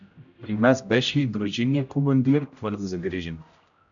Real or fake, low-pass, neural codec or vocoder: fake; 7.2 kHz; codec, 16 kHz, 0.5 kbps, X-Codec, HuBERT features, trained on general audio